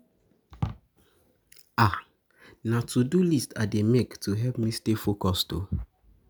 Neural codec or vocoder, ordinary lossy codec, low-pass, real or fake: none; none; none; real